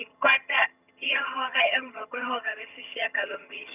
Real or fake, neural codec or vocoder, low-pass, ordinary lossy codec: fake; vocoder, 22.05 kHz, 80 mel bands, HiFi-GAN; 3.6 kHz; none